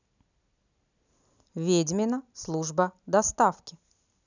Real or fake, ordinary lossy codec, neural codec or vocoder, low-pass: real; none; none; 7.2 kHz